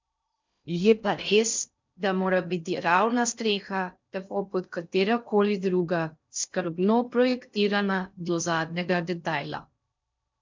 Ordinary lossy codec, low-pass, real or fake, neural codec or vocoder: MP3, 64 kbps; 7.2 kHz; fake; codec, 16 kHz in and 24 kHz out, 0.6 kbps, FocalCodec, streaming, 2048 codes